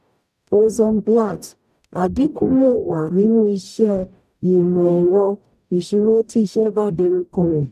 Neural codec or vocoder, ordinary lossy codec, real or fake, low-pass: codec, 44.1 kHz, 0.9 kbps, DAC; none; fake; 14.4 kHz